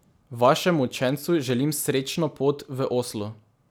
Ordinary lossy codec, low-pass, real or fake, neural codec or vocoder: none; none; real; none